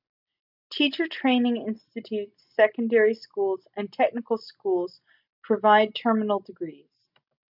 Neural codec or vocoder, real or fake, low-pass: none; real; 5.4 kHz